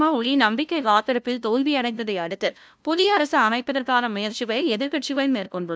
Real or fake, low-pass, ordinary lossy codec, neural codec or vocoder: fake; none; none; codec, 16 kHz, 0.5 kbps, FunCodec, trained on LibriTTS, 25 frames a second